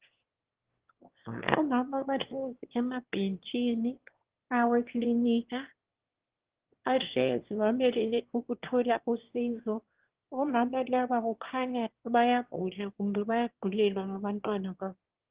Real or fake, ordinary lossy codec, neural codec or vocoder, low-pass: fake; Opus, 16 kbps; autoencoder, 22.05 kHz, a latent of 192 numbers a frame, VITS, trained on one speaker; 3.6 kHz